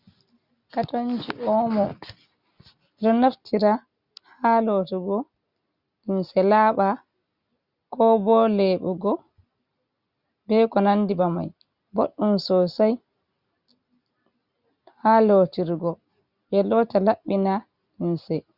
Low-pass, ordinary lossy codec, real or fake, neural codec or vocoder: 5.4 kHz; Opus, 64 kbps; real; none